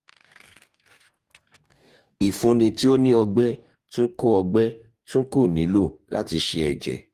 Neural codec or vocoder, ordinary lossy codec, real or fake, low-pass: codec, 44.1 kHz, 2.6 kbps, DAC; Opus, 32 kbps; fake; 14.4 kHz